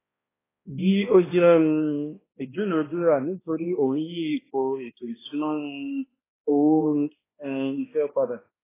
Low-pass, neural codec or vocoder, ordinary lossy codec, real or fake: 3.6 kHz; codec, 16 kHz, 1 kbps, X-Codec, HuBERT features, trained on balanced general audio; AAC, 16 kbps; fake